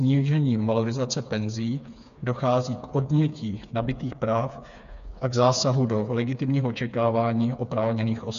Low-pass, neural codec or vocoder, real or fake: 7.2 kHz; codec, 16 kHz, 4 kbps, FreqCodec, smaller model; fake